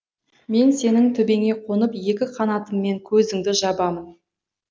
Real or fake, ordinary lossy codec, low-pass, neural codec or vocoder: real; none; none; none